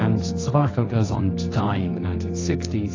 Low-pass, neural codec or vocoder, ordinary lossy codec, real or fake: 7.2 kHz; codec, 24 kHz, 0.9 kbps, WavTokenizer, medium music audio release; AAC, 32 kbps; fake